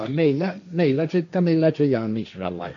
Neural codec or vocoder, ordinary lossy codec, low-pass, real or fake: codec, 16 kHz, 1.1 kbps, Voila-Tokenizer; none; 7.2 kHz; fake